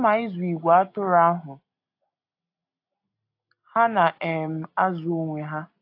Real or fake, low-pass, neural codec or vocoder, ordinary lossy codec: real; 5.4 kHz; none; AAC, 32 kbps